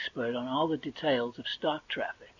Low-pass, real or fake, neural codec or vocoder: 7.2 kHz; real; none